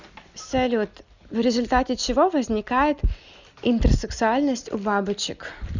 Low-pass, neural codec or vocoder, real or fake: 7.2 kHz; none; real